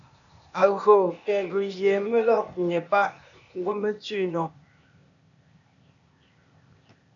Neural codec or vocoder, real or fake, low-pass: codec, 16 kHz, 0.8 kbps, ZipCodec; fake; 7.2 kHz